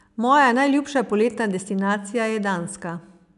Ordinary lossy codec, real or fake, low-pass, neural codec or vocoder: none; real; 10.8 kHz; none